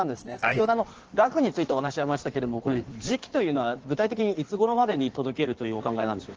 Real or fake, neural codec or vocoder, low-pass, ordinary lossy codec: fake; codec, 16 kHz in and 24 kHz out, 1.1 kbps, FireRedTTS-2 codec; 7.2 kHz; Opus, 16 kbps